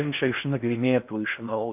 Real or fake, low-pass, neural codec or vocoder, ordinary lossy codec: fake; 3.6 kHz; codec, 16 kHz in and 24 kHz out, 0.6 kbps, FocalCodec, streaming, 4096 codes; AAC, 32 kbps